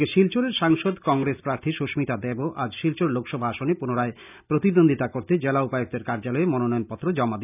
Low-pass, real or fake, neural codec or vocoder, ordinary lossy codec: 3.6 kHz; real; none; none